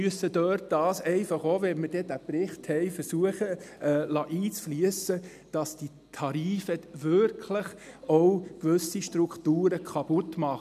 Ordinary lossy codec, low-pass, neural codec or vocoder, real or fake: none; 14.4 kHz; none; real